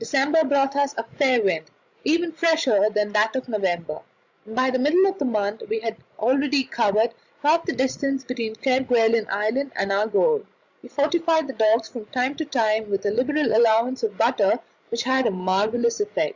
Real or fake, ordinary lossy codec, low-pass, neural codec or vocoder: fake; Opus, 64 kbps; 7.2 kHz; codec, 16 kHz, 16 kbps, FreqCodec, larger model